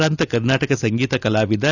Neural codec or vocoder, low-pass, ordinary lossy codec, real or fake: none; 7.2 kHz; none; real